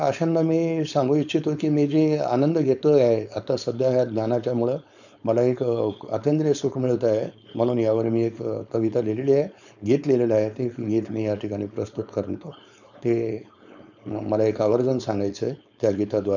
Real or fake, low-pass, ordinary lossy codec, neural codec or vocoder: fake; 7.2 kHz; none; codec, 16 kHz, 4.8 kbps, FACodec